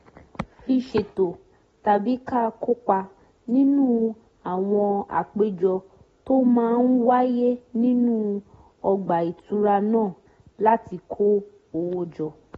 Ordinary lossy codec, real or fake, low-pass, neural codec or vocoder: AAC, 24 kbps; real; 19.8 kHz; none